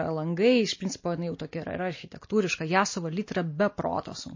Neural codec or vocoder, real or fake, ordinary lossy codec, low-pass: none; real; MP3, 32 kbps; 7.2 kHz